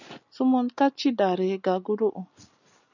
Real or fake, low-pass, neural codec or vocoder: real; 7.2 kHz; none